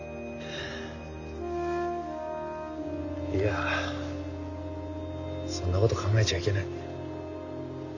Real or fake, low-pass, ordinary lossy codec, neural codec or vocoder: real; 7.2 kHz; none; none